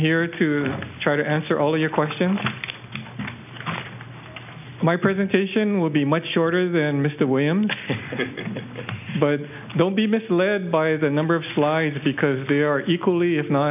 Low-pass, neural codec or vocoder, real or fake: 3.6 kHz; none; real